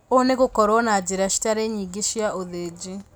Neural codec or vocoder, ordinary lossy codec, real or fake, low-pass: none; none; real; none